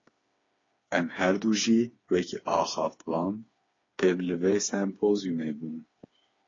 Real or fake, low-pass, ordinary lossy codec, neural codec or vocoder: fake; 7.2 kHz; AAC, 32 kbps; codec, 16 kHz, 4 kbps, FreqCodec, smaller model